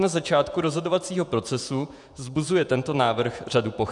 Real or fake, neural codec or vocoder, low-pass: fake; vocoder, 48 kHz, 128 mel bands, Vocos; 10.8 kHz